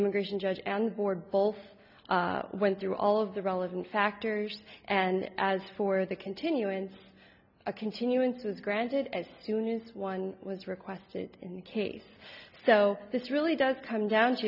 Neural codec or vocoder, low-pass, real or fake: none; 5.4 kHz; real